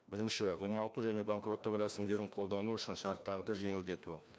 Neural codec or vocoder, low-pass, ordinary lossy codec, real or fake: codec, 16 kHz, 1 kbps, FreqCodec, larger model; none; none; fake